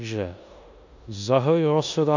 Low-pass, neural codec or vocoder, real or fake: 7.2 kHz; codec, 16 kHz in and 24 kHz out, 0.9 kbps, LongCat-Audio-Codec, four codebook decoder; fake